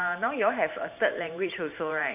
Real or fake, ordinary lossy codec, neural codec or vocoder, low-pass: fake; none; vocoder, 44.1 kHz, 128 mel bands every 512 samples, BigVGAN v2; 3.6 kHz